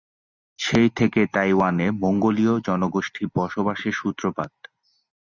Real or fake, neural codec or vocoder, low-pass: real; none; 7.2 kHz